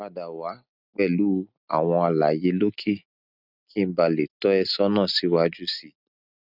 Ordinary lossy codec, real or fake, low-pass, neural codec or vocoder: none; real; 5.4 kHz; none